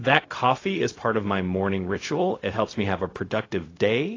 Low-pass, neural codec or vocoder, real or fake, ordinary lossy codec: 7.2 kHz; codec, 16 kHz, 0.4 kbps, LongCat-Audio-Codec; fake; AAC, 32 kbps